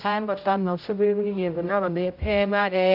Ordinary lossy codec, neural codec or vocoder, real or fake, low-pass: none; codec, 16 kHz, 0.5 kbps, X-Codec, HuBERT features, trained on general audio; fake; 5.4 kHz